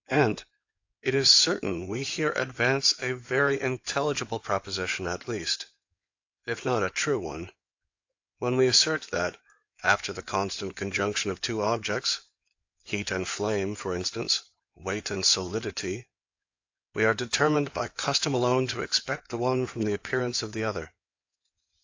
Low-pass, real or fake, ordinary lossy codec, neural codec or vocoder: 7.2 kHz; fake; AAC, 48 kbps; codec, 16 kHz in and 24 kHz out, 2.2 kbps, FireRedTTS-2 codec